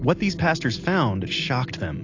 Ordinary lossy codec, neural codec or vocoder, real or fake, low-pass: AAC, 48 kbps; none; real; 7.2 kHz